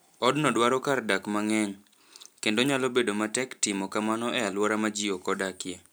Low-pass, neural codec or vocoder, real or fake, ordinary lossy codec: none; none; real; none